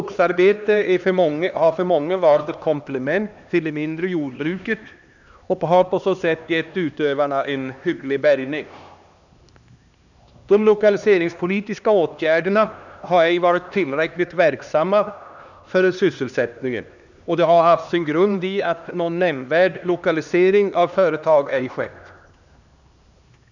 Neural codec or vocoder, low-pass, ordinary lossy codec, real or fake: codec, 16 kHz, 2 kbps, X-Codec, HuBERT features, trained on LibriSpeech; 7.2 kHz; none; fake